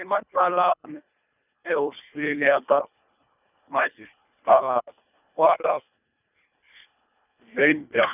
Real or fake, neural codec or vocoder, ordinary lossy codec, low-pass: fake; codec, 24 kHz, 1.5 kbps, HILCodec; none; 3.6 kHz